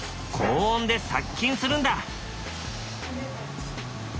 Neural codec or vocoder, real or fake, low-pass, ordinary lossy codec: none; real; none; none